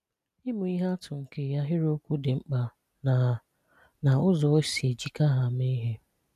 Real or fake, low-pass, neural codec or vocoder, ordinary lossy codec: real; 14.4 kHz; none; none